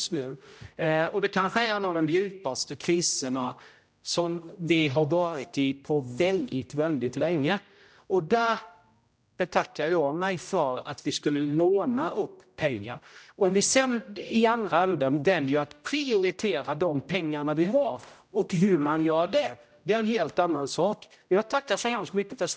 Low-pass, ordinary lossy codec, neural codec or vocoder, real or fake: none; none; codec, 16 kHz, 0.5 kbps, X-Codec, HuBERT features, trained on general audio; fake